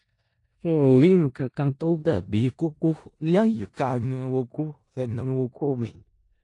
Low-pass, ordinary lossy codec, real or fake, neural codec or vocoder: 10.8 kHz; AAC, 48 kbps; fake; codec, 16 kHz in and 24 kHz out, 0.4 kbps, LongCat-Audio-Codec, four codebook decoder